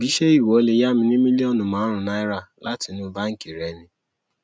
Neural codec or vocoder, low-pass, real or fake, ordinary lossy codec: none; none; real; none